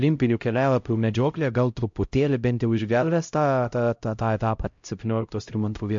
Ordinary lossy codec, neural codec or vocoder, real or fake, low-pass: MP3, 48 kbps; codec, 16 kHz, 0.5 kbps, X-Codec, HuBERT features, trained on LibriSpeech; fake; 7.2 kHz